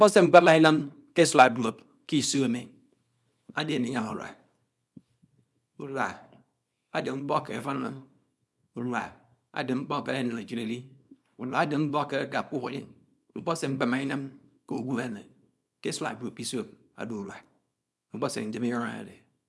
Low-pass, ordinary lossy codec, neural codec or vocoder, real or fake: none; none; codec, 24 kHz, 0.9 kbps, WavTokenizer, small release; fake